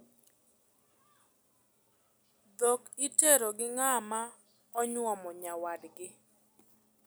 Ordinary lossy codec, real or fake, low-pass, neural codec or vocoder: none; real; none; none